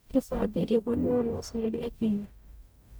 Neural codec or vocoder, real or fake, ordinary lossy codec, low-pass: codec, 44.1 kHz, 0.9 kbps, DAC; fake; none; none